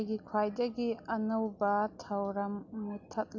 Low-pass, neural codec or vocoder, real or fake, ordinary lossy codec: 7.2 kHz; none; real; none